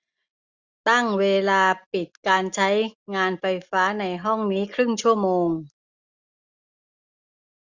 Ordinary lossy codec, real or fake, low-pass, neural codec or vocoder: Opus, 64 kbps; real; 7.2 kHz; none